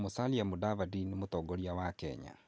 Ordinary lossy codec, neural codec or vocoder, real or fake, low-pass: none; none; real; none